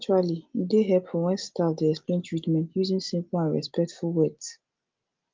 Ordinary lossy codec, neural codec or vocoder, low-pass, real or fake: Opus, 24 kbps; none; 7.2 kHz; real